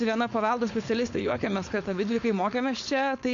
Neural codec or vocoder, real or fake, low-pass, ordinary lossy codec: codec, 16 kHz, 4.8 kbps, FACodec; fake; 7.2 kHz; MP3, 64 kbps